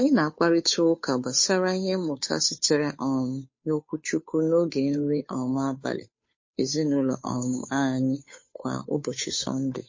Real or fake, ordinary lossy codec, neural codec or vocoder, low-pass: fake; MP3, 32 kbps; codec, 16 kHz, 2 kbps, FunCodec, trained on Chinese and English, 25 frames a second; 7.2 kHz